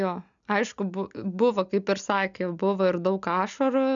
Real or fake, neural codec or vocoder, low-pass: real; none; 7.2 kHz